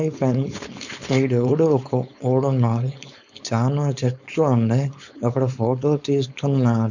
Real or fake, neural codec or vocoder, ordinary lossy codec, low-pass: fake; codec, 16 kHz, 4.8 kbps, FACodec; none; 7.2 kHz